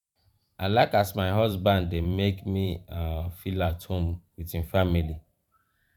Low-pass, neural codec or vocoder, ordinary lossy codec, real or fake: 19.8 kHz; vocoder, 44.1 kHz, 128 mel bands every 512 samples, BigVGAN v2; none; fake